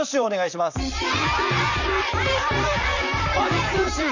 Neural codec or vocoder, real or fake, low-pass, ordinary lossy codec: vocoder, 44.1 kHz, 128 mel bands, Pupu-Vocoder; fake; 7.2 kHz; none